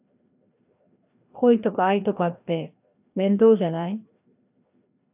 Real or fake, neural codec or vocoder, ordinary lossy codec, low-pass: fake; codec, 16 kHz, 1 kbps, FreqCodec, larger model; AAC, 32 kbps; 3.6 kHz